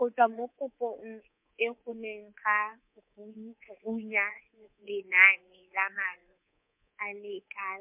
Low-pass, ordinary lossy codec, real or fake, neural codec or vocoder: 3.6 kHz; MP3, 32 kbps; fake; codec, 24 kHz, 3.1 kbps, DualCodec